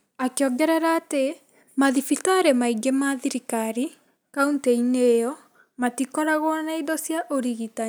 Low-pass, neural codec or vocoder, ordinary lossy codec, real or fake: none; none; none; real